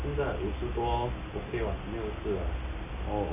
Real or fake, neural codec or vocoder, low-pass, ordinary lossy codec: real; none; 3.6 kHz; none